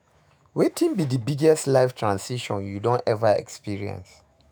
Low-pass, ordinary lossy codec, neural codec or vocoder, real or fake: none; none; autoencoder, 48 kHz, 128 numbers a frame, DAC-VAE, trained on Japanese speech; fake